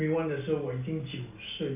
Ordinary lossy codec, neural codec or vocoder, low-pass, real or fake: AAC, 32 kbps; none; 3.6 kHz; real